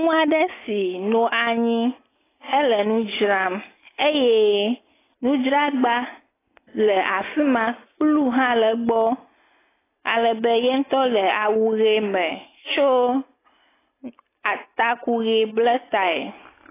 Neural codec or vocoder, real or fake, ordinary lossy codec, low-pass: none; real; AAC, 16 kbps; 3.6 kHz